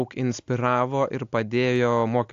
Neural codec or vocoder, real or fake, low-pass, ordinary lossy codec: none; real; 7.2 kHz; AAC, 64 kbps